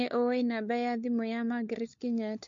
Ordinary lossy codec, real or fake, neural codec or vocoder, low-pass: MP3, 48 kbps; fake; codec, 16 kHz, 4 kbps, FunCodec, trained on LibriTTS, 50 frames a second; 7.2 kHz